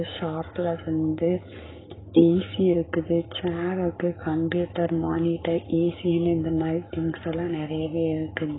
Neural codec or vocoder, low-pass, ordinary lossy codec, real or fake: codec, 44.1 kHz, 7.8 kbps, Pupu-Codec; 7.2 kHz; AAC, 16 kbps; fake